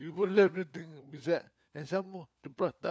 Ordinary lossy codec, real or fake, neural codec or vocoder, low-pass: none; fake; codec, 16 kHz, 4 kbps, FunCodec, trained on LibriTTS, 50 frames a second; none